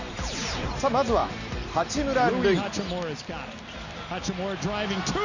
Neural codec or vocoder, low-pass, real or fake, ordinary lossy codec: none; 7.2 kHz; real; none